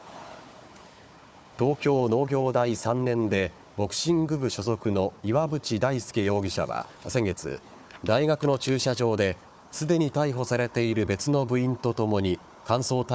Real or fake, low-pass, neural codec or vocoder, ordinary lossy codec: fake; none; codec, 16 kHz, 4 kbps, FunCodec, trained on Chinese and English, 50 frames a second; none